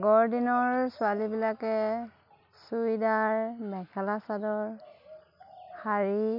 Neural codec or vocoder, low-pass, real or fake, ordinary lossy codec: none; 5.4 kHz; real; none